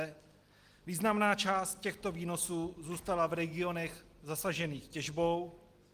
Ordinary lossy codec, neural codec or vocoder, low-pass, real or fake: Opus, 24 kbps; none; 14.4 kHz; real